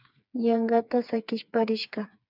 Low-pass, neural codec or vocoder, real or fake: 5.4 kHz; codec, 16 kHz, 4 kbps, FreqCodec, smaller model; fake